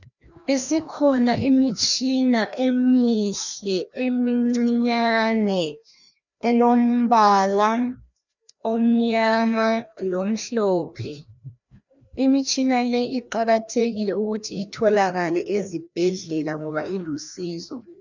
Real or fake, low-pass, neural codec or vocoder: fake; 7.2 kHz; codec, 16 kHz, 1 kbps, FreqCodec, larger model